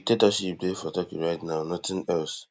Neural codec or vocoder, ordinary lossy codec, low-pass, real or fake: none; none; none; real